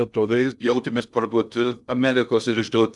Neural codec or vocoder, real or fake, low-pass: codec, 16 kHz in and 24 kHz out, 0.6 kbps, FocalCodec, streaming, 2048 codes; fake; 10.8 kHz